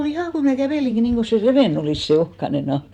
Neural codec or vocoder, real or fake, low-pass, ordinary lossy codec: vocoder, 44.1 kHz, 128 mel bands every 512 samples, BigVGAN v2; fake; 19.8 kHz; none